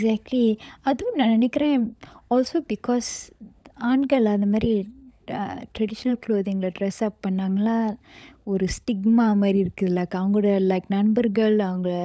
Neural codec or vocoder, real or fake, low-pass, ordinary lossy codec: codec, 16 kHz, 16 kbps, FunCodec, trained on LibriTTS, 50 frames a second; fake; none; none